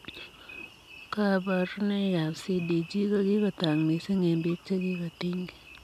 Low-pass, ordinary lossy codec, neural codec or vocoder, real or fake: 14.4 kHz; none; none; real